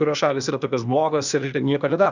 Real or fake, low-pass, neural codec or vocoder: fake; 7.2 kHz; codec, 16 kHz, 0.8 kbps, ZipCodec